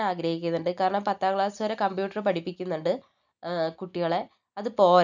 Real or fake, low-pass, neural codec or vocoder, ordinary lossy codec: real; 7.2 kHz; none; none